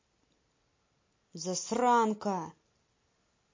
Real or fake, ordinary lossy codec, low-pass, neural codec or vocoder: real; MP3, 32 kbps; 7.2 kHz; none